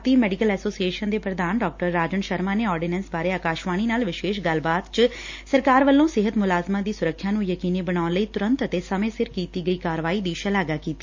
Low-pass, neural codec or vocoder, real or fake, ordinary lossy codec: 7.2 kHz; none; real; MP3, 48 kbps